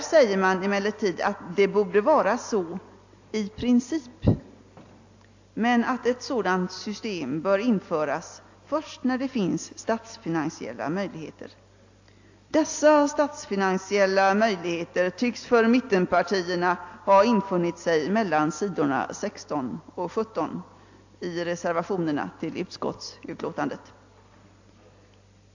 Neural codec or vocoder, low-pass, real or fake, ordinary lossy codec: none; 7.2 kHz; real; AAC, 48 kbps